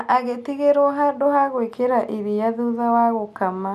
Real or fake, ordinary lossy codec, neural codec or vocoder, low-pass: real; none; none; 14.4 kHz